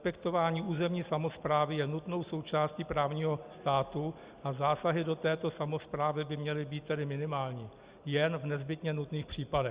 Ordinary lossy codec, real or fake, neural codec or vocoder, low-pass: Opus, 64 kbps; real; none; 3.6 kHz